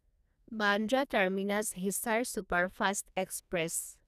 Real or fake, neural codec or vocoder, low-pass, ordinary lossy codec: fake; codec, 44.1 kHz, 2.6 kbps, SNAC; 14.4 kHz; none